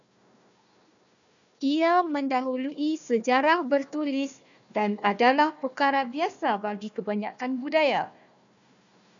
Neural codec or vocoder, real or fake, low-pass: codec, 16 kHz, 1 kbps, FunCodec, trained on Chinese and English, 50 frames a second; fake; 7.2 kHz